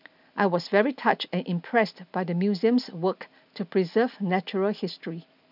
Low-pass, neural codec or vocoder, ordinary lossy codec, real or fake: 5.4 kHz; none; none; real